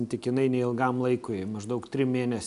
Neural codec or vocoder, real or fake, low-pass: none; real; 10.8 kHz